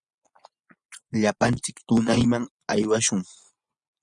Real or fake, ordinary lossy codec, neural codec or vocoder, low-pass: fake; Opus, 64 kbps; vocoder, 24 kHz, 100 mel bands, Vocos; 10.8 kHz